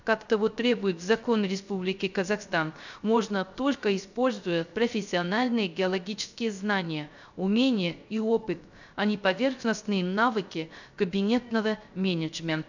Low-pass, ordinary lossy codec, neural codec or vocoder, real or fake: 7.2 kHz; none; codec, 16 kHz, 0.3 kbps, FocalCodec; fake